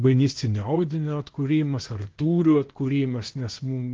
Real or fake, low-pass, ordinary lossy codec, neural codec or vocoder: fake; 7.2 kHz; Opus, 16 kbps; codec, 16 kHz, 0.8 kbps, ZipCodec